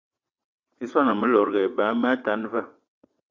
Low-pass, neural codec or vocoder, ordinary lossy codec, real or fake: 7.2 kHz; vocoder, 22.05 kHz, 80 mel bands, WaveNeXt; MP3, 64 kbps; fake